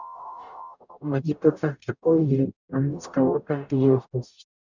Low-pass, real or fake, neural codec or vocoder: 7.2 kHz; fake; codec, 44.1 kHz, 0.9 kbps, DAC